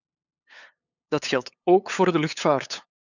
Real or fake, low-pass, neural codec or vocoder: fake; 7.2 kHz; codec, 16 kHz, 8 kbps, FunCodec, trained on LibriTTS, 25 frames a second